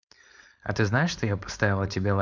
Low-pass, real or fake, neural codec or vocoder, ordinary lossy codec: 7.2 kHz; fake; codec, 16 kHz, 4.8 kbps, FACodec; none